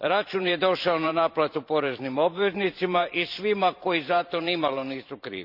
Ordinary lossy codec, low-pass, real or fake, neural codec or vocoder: none; 5.4 kHz; fake; vocoder, 44.1 kHz, 128 mel bands every 512 samples, BigVGAN v2